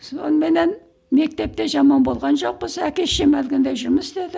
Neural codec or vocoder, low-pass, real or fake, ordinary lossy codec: none; none; real; none